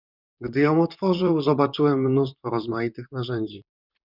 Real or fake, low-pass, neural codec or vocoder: fake; 5.4 kHz; vocoder, 44.1 kHz, 128 mel bands, Pupu-Vocoder